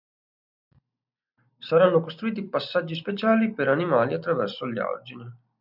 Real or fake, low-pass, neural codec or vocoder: real; 5.4 kHz; none